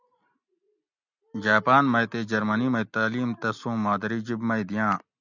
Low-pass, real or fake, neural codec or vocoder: 7.2 kHz; real; none